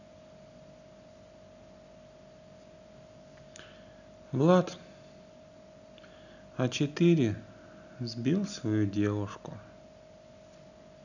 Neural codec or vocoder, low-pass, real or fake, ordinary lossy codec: none; 7.2 kHz; real; none